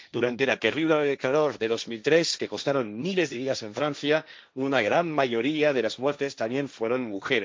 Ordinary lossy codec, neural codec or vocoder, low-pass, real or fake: none; codec, 16 kHz, 1.1 kbps, Voila-Tokenizer; none; fake